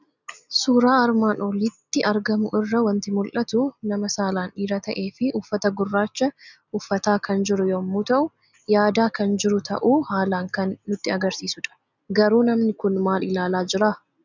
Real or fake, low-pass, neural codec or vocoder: real; 7.2 kHz; none